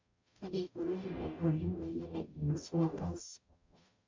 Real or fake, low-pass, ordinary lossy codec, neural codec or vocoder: fake; 7.2 kHz; MP3, 48 kbps; codec, 44.1 kHz, 0.9 kbps, DAC